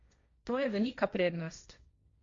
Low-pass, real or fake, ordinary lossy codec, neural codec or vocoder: 7.2 kHz; fake; Opus, 64 kbps; codec, 16 kHz, 1.1 kbps, Voila-Tokenizer